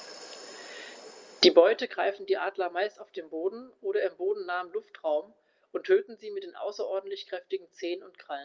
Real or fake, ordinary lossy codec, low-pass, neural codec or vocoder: real; Opus, 32 kbps; 7.2 kHz; none